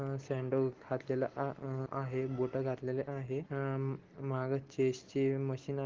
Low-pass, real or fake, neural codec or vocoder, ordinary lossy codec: 7.2 kHz; real; none; Opus, 16 kbps